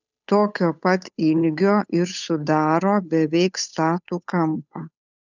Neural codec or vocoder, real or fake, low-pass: codec, 16 kHz, 8 kbps, FunCodec, trained on Chinese and English, 25 frames a second; fake; 7.2 kHz